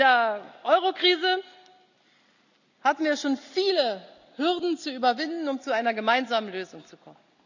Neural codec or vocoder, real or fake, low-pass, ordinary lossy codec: none; real; 7.2 kHz; none